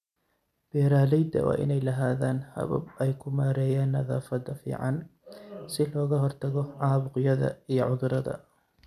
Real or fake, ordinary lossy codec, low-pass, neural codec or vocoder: real; none; 14.4 kHz; none